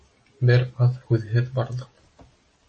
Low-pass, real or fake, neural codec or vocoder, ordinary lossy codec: 10.8 kHz; real; none; MP3, 32 kbps